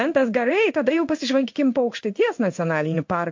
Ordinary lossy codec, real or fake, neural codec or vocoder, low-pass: MP3, 48 kbps; fake; codec, 16 kHz in and 24 kHz out, 1 kbps, XY-Tokenizer; 7.2 kHz